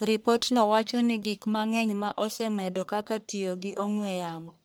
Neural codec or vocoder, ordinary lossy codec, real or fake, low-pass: codec, 44.1 kHz, 1.7 kbps, Pupu-Codec; none; fake; none